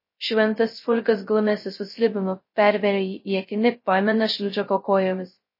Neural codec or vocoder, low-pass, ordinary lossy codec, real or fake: codec, 16 kHz, 0.2 kbps, FocalCodec; 5.4 kHz; MP3, 24 kbps; fake